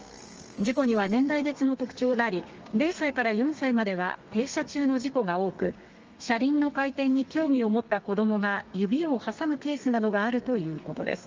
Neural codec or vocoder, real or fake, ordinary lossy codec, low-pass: codec, 32 kHz, 1.9 kbps, SNAC; fake; Opus, 24 kbps; 7.2 kHz